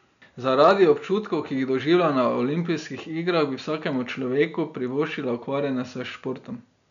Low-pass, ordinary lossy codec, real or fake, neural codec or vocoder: 7.2 kHz; none; real; none